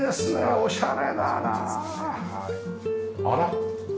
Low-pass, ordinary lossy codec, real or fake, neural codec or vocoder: none; none; real; none